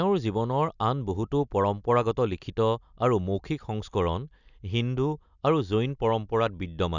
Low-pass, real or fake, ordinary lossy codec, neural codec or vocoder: 7.2 kHz; real; none; none